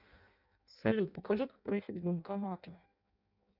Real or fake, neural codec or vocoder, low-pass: fake; codec, 16 kHz in and 24 kHz out, 0.6 kbps, FireRedTTS-2 codec; 5.4 kHz